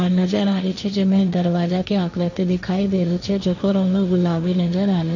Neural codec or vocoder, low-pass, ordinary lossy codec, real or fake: codec, 16 kHz, 1.1 kbps, Voila-Tokenizer; 7.2 kHz; none; fake